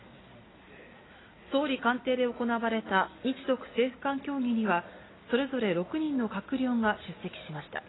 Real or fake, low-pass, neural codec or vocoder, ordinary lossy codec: real; 7.2 kHz; none; AAC, 16 kbps